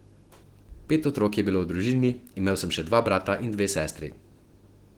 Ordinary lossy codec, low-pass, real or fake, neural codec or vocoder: Opus, 16 kbps; 19.8 kHz; fake; autoencoder, 48 kHz, 128 numbers a frame, DAC-VAE, trained on Japanese speech